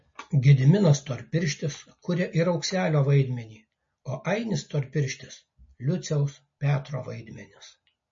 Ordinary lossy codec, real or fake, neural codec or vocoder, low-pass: MP3, 32 kbps; real; none; 7.2 kHz